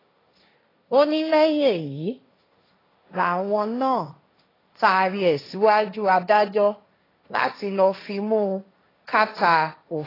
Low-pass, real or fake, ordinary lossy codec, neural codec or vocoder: 5.4 kHz; fake; AAC, 24 kbps; codec, 16 kHz, 1.1 kbps, Voila-Tokenizer